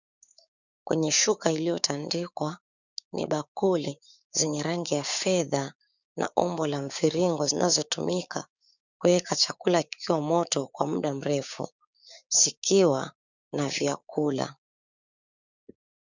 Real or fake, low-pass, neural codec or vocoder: fake; 7.2 kHz; codec, 16 kHz, 6 kbps, DAC